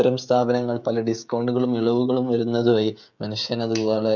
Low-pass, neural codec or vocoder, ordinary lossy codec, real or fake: 7.2 kHz; codec, 16 kHz, 16 kbps, FreqCodec, smaller model; none; fake